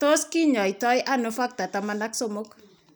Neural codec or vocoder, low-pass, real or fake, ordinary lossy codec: none; none; real; none